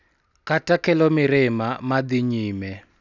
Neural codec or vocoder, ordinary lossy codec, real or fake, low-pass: none; none; real; 7.2 kHz